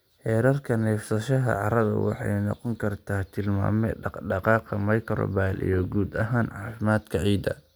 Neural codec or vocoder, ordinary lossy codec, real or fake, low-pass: none; none; real; none